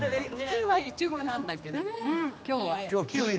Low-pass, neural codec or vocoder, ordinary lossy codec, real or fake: none; codec, 16 kHz, 2 kbps, X-Codec, HuBERT features, trained on general audio; none; fake